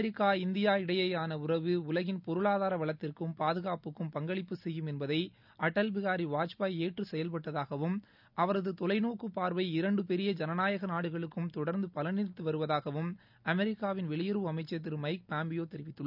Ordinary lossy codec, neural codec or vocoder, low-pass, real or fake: none; none; 5.4 kHz; real